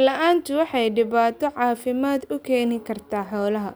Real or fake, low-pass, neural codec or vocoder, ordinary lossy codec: real; none; none; none